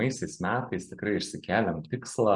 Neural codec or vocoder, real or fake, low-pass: none; real; 10.8 kHz